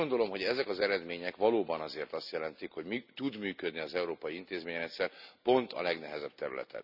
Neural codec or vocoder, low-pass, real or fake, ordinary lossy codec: none; 5.4 kHz; real; none